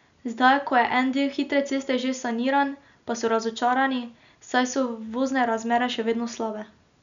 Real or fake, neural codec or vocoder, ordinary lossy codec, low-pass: real; none; none; 7.2 kHz